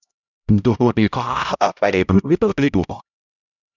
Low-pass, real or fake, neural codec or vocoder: 7.2 kHz; fake; codec, 16 kHz, 0.5 kbps, X-Codec, HuBERT features, trained on LibriSpeech